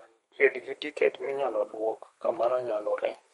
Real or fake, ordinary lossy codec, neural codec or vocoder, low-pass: fake; MP3, 48 kbps; codec, 32 kHz, 1.9 kbps, SNAC; 14.4 kHz